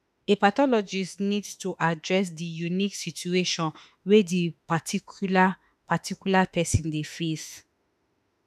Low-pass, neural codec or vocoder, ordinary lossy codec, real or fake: 14.4 kHz; autoencoder, 48 kHz, 32 numbers a frame, DAC-VAE, trained on Japanese speech; none; fake